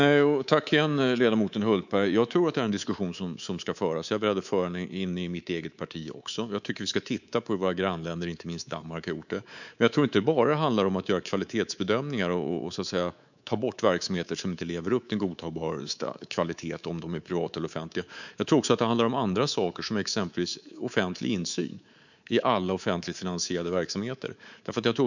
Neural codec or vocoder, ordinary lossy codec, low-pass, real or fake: codec, 24 kHz, 3.1 kbps, DualCodec; none; 7.2 kHz; fake